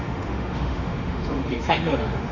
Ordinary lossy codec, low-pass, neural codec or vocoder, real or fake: Opus, 64 kbps; 7.2 kHz; autoencoder, 48 kHz, 32 numbers a frame, DAC-VAE, trained on Japanese speech; fake